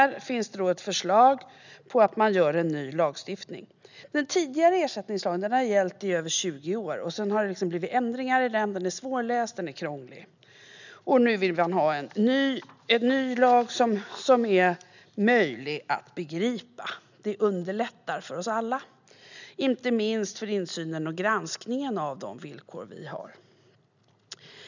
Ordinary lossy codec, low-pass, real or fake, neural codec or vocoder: none; 7.2 kHz; real; none